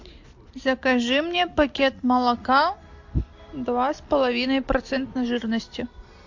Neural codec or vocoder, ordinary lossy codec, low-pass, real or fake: none; AAC, 48 kbps; 7.2 kHz; real